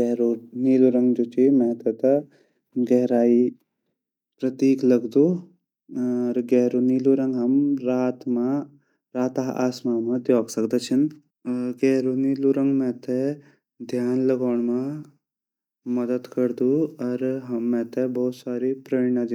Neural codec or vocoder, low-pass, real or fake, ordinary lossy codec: none; 19.8 kHz; real; none